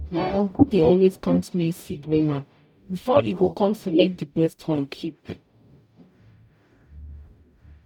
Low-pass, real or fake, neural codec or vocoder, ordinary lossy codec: 19.8 kHz; fake; codec, 44.1 kHz, 0.9 kbps, DAC; none